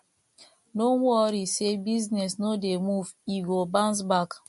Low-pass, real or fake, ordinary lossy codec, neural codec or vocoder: 14.4 kHz; real; MP3, 48 kbps; none